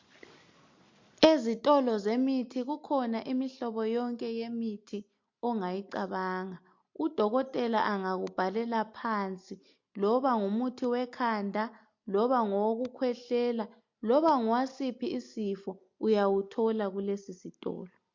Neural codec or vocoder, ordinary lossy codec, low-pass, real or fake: none; MP3, 48 kbps; 7.2 kHz; real